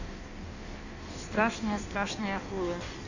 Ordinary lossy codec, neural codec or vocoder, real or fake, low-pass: none; codec, 16 kHz in and 24 kHz out, 1.1 kbps, FireRedTTS-2 codec; fake; 7.2 kHz